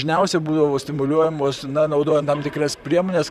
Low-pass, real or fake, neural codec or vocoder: 14.4 kHz; fake; vocoder, 44.1 kHz, 128 mel bands, Pupu-Vocoder